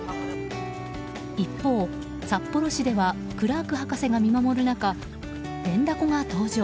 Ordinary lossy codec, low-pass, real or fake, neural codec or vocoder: none; none; real; none